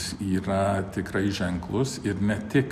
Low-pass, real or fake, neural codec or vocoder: 14.4 kHz; real; none